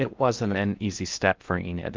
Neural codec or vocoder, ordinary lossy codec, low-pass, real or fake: codec, 16 kHz in and 24 kHz out, 0.6 kbps, FocalCodec, streaming, 2048 codes; Opus, 24 kbps; 7.2 kHz; fake